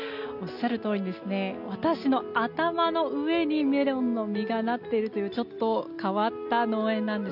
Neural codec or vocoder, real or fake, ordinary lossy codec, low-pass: none; real; none; 5.4 kHz